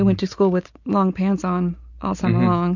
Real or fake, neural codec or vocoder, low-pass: real; none; 7.2 kHz